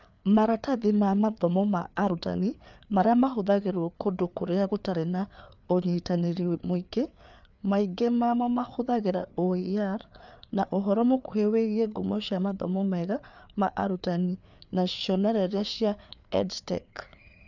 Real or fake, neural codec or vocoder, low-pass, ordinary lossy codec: fake; codec, 16 kHz, 4 kbps, FreqCodec, larger model; 7.2 kHz; none